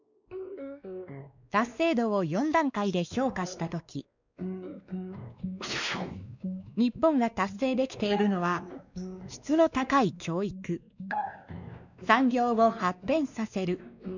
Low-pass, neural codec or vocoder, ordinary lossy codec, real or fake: 7.2 kHz; codec, 16 kHz, 2 kbps, X-Codec, WavLM features, trained on Multilingual LibriSpeech; none; fake